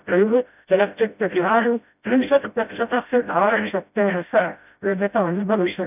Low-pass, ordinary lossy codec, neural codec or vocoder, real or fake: 3.6 kHz; none; codec, 16 kHz, 0.5 kbps, FreqCodec, smaller model; fake